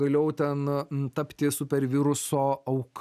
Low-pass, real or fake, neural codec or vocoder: 14.4 kHz; real; none